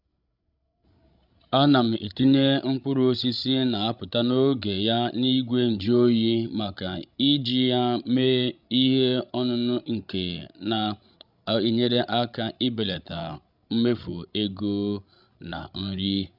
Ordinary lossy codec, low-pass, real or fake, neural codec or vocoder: none; 5.4 kHz; fake; codec, 16 kHz, 16 kbps, FreqCodec, larger model